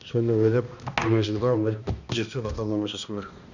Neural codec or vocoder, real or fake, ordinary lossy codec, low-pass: codec, 16 kHz, 1 kbps, X-Codec, HuBERT features, trained on balanced general audio; fake; none; 7.2 kHz